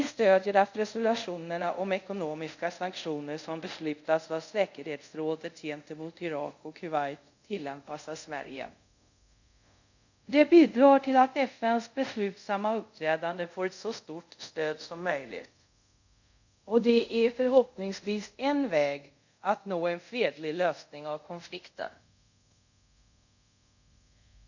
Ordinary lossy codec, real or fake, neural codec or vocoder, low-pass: none; fake; codec, 24 kHz, 0.5 kbps, DualCodec; 7.2 kHz